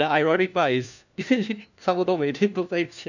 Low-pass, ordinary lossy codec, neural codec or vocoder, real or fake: 7.2 kHz; none; codec, 16 kHz, 1 kbps, FunCodec, trained on LibriTTS, 50 frames a second; fake